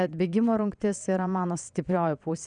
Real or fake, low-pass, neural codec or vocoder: fake; 9.9 kHz; vocoder, 22.05 kHz, 80 mel bands, WaveNeXt